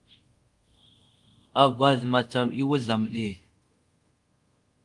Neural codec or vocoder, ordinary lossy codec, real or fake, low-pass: codec, 24 kHz, 0.5 kbps, DualCodec; Opus, 24 kbps; fake; 10.8 kHz